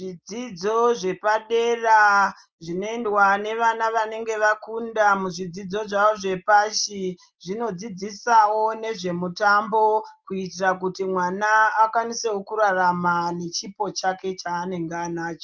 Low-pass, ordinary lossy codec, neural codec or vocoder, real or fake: 7.2 kHz; Opus, 24 kbps; none; real